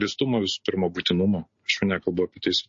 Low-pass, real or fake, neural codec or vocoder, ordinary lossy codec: 7.2 kHz; real; none; MP3, 32 kbps